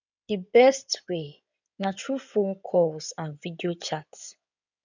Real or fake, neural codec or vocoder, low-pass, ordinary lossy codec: fake; codec, 16 kHz in and 24 kHz out, 2.2 kbps, FireRedTTS-2 codec; 7.2 kHz; none